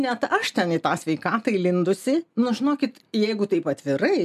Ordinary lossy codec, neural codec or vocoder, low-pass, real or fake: MP3, 96 kbps; none; 14.4 kHz; real